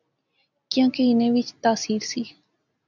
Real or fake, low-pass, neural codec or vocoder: real; 7.2 kHz; none